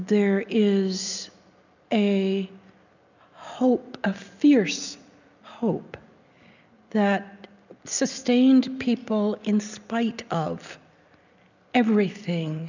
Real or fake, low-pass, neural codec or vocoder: real; 7.2 kHz; none